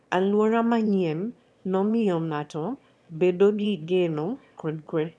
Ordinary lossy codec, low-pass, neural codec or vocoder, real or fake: none; none; autoencoder, 22.05 kHz, a latent of 192 numbers a frame, VITS, trained on one speaker; fake